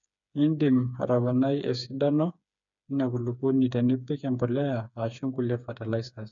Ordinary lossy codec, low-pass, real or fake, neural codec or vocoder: none; 7.2 kHz; fake; codec, 16 kHz, 4 kbps, FreqCodec, smaller model